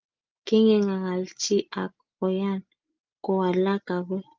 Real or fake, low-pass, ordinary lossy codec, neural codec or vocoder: real; 7.2 kHz; Opus, 32 kbps; none